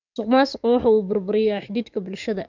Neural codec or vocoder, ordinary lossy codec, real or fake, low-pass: codec, 44.1 kHz, 7.8 kbps, DAC; none; fake; 7.2 kHz